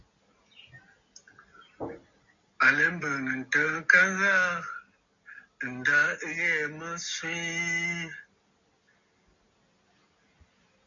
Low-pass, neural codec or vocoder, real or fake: 7.2 kHz; none; real